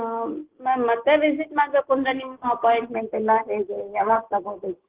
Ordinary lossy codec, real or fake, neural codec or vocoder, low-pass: Opus, 16 kbps; real; none; 3.6 kHz